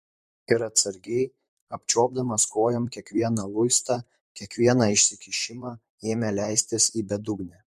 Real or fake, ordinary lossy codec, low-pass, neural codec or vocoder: fake; MP3, 64 kbps; 14.4 kHz; vocoder, 44.1 kHz, 128 mel bands, Pupu-Vocoder